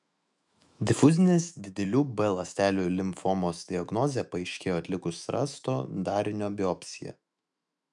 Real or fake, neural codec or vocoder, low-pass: fake; autoencoder, 48 kHz, 128 numbers a frame, DAC-VAE, trained on Japanese speech; 10.8 kHz